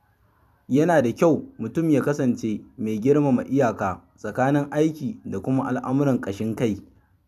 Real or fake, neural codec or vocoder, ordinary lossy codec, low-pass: fake; vocoder, 44.1 kHz, 128 mel bands every 256 samples, BigVGAN v2; none; 14.4 kHz